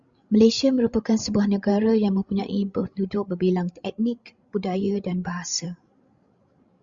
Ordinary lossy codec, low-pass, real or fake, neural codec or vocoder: Opus, 64 kbps; 7.2 kHz; fake; codec, 16 kHz, 16 kbps, FreqCodec, larger model